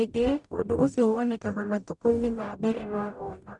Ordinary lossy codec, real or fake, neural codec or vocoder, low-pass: MP3, 96 kbps; fake; codec, 44.1 kHz, 0.9 kbps, DAC; 10.8 kHz